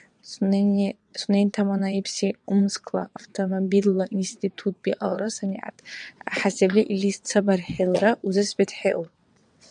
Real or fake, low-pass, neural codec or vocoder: fake; 9.9 kHz; vocoder, 22.05 kHz, 80 mel bands, WaveNeXt